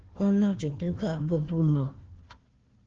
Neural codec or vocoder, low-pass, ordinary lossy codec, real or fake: codec, 16 kHz, 1 kbps, FunCodec, trained on Chinese and English, 50 frames a second; 7.2 kHz; Opus, 16 kbps; fake